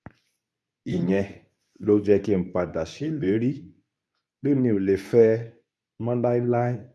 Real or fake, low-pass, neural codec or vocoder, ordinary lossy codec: fake; none; codec, 24 kHz, 0.9 kbps, WavTokenizer, medium speech release version 2; none